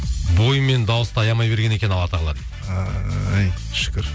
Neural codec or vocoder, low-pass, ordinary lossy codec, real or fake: none; none; none; real